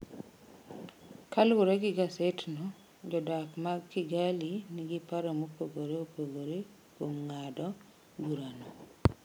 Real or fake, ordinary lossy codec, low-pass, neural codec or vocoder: real; none; none; none